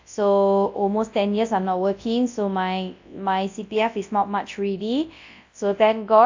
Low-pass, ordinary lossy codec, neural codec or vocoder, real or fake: 7.2 kHz; AAC, 48 kbps; codec, 24 kHz, 0.9 kbps, WavTokenizer, large speech release; fake